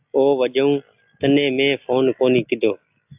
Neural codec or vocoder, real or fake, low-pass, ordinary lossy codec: none; real; 3.6 kHz; AAC, 32 kbps